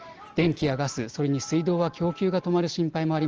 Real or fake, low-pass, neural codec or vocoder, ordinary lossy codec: real; 7.2 kHz; none; Opus, 16 kbps